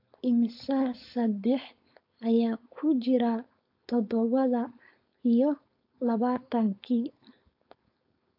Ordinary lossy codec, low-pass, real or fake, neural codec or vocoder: AAC, 32 kbps; 5.4 kHz; fake; codec, 16 kHz, 4.8 kbps, FACodec